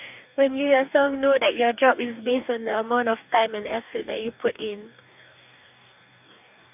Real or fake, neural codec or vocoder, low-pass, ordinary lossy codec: fake; codec, 44.1 kHz, 2.6 kbps, DAC; 3.6 kHz; none